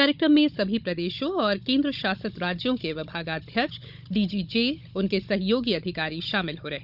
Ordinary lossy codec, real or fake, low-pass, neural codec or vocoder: none; fake; 5.4 kHz; codec, 16 kHz, 16 kbps, FunCodec, trained on Chinese and English, 50 frames a second